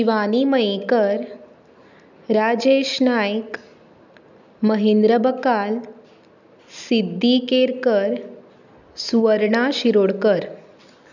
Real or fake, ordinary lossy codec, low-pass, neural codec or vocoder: real; none; 7.2 kHz; none